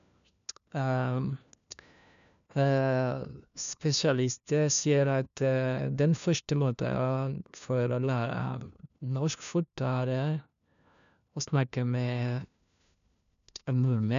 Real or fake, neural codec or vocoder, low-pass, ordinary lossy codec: fake; codec, 16 kHz, 1 kbps, FunCodec, trained on LibriTTS, 50 frames a second; 7.2 kHz; none